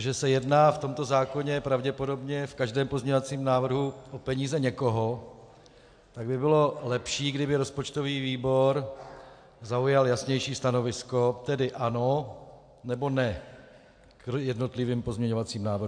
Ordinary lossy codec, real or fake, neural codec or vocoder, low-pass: AAC, 64 kbps; real; none; 9.9 kHz